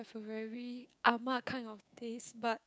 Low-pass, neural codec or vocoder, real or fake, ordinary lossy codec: none; codec, 16 kHz, 6 kbps, DAC; fake; none